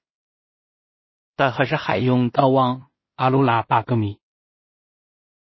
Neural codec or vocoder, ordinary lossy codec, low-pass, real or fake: codec, 16 kHz in and 24 kHz out, 0.4 kbps, LongCat-Audio-Codec, two codebook decoder; MP3, 24 kbps; 7.2 kHz; fake